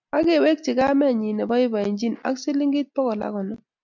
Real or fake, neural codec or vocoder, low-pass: real; none; 7.2 kHz